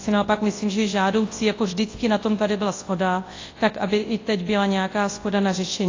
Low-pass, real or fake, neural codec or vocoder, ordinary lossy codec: 7.2 kHz; fake; codec, 24 kHz, 0.9 kbps, WavTokenizer, large speech release; AAC, 32 kbps